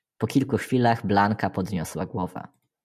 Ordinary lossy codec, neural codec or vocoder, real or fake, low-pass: MP3, 96 kbps; none; real; 14.4 kHz